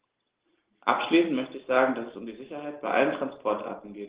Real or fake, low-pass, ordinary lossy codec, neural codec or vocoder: real; 3.6 kHz; Opus, 16 kbps; none